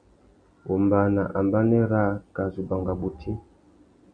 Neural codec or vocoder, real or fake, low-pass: none; real; 9.9 kHz